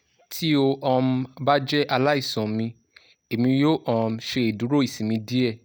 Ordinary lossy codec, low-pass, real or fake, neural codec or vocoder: none; none; real; none